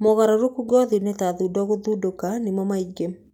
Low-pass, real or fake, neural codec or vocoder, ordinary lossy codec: 19.8 kHz; real; none; none